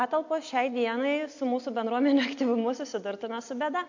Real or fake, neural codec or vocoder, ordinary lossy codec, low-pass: real; none; AAC, 48 kbps; 7.2 kHz